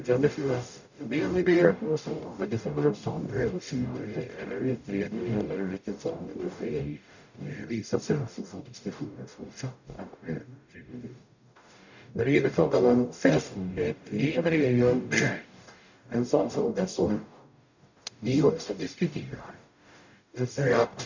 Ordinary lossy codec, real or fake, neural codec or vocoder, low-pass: none; fake; codec, 44.1 kHz, 0.9 kbps, DAC; 7.2 kHz